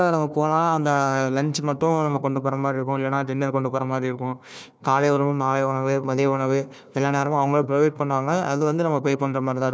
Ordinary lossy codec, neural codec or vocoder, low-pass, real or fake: none; codec, 16 kHz, 1 kbps, FunCodec, trained on Chinese and English, 50 frames a second; none; fake